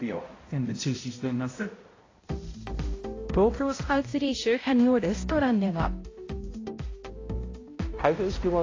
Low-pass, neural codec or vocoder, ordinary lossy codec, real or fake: 7.2 kHz; codec, 16 kHz, 0.5 kbps, X-Codec, HuBERT features, trained on balanced general audio; AAC, 32 kbps; fake